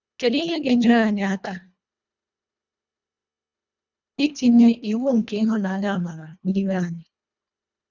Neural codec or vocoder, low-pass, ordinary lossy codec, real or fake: codec, 24 kHz, 1.5 kbps, HILCodec; 7.2 kHz; none; fake